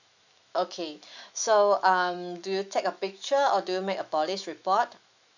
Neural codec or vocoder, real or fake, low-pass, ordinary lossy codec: autoencoder, 48 kHz, 128 numbers a frame, DAC-VAE, trained on Japanese speech; fake; 7.2 kHz; none